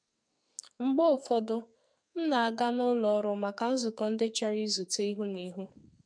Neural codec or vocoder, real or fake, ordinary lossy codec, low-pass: codec, 44.1 kHz, 2.6 kbps, SNAC; fake; MP3, 64 kbps; 9.9 kHz